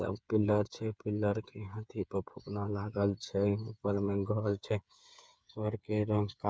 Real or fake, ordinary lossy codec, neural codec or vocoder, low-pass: fake; none; codec, 16 kHz, 8 kbps, FreqCodec, smaller model; none